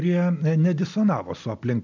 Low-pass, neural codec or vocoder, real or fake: 7.2 kHz; none; real